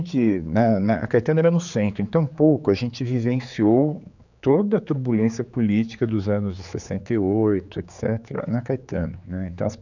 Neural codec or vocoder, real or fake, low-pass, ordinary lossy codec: codec, 16 kHz, 4 kbps, X-Codec, HuBERT features, trained on general audio; fake; 7.2 kHz; none